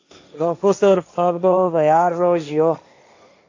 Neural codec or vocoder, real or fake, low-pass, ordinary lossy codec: codec, 16 kHz, 1.1 kbps, Voila-Tokenizer; fake; 7.2 kHz; AAC, 48 kbps